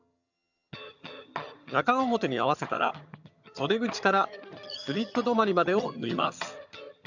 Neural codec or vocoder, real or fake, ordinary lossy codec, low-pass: vocoder, 22.05 kHz, 80 mel bands, HiFi-GAN; fake; none; 7.2 kHz